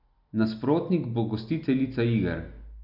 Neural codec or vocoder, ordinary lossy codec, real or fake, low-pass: none; none; real; 5.4 kHz